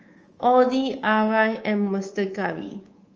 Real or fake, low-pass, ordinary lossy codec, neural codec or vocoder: fake; 7.2 kHz; Opus, 24 kbps; codec, 24 kHz, 3.1 kbps, DualCodec